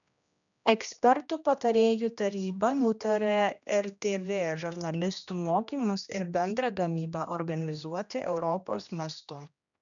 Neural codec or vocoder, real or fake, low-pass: codec, 16 kHz, 1 kbps, X-Codec, HuBERT features, trained on general audio; fake; 7.2 kHz